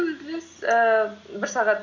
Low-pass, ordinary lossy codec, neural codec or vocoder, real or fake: 7.2 kHz; none; none; real